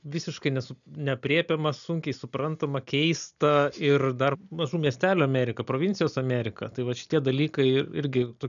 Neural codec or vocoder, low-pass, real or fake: none; 7.2 kHz; real